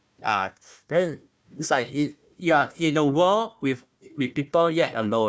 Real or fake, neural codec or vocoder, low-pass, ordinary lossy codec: fake; codec, 16 kHz, 1 kbps, FunCodec, trained on Chinese and English, 50 frames a second; none; none